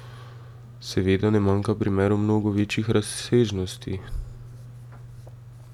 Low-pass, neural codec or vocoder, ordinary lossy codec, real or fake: 19.8 kHz; none; none; real